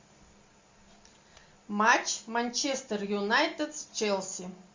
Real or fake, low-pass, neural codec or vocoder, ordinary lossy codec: real; 7.2 kHz; none; MP3, 64 kbps